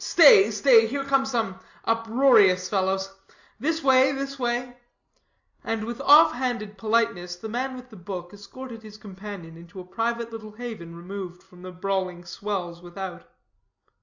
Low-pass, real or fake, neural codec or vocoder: 7.2 kHz; real; none